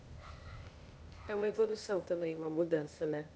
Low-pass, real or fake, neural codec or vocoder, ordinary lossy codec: none; fake; codec, 16 kHz, 0.8 kbps, ZipCodec; none